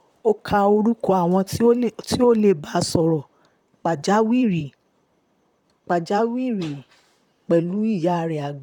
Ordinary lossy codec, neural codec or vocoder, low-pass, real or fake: none; vocoder, 44.1 kHz, 128 mel bands, Pupu-Vocoder; 19.8 kHz; fake